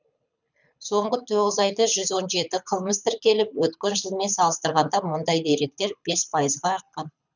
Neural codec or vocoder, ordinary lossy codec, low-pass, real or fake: codec, 24 kHz, 6 kbps, HILCodec; none; 7.2 kHz; fake